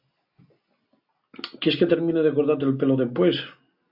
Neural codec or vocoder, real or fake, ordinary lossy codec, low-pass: none; real; AAC, 48 kbps; 5.4 kHz